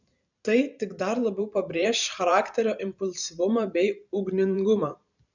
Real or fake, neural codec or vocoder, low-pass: fake; vocoder, 44.1 kHz, 128 mel bands every 512 samples, BigVGAN v2; 7.2 kHz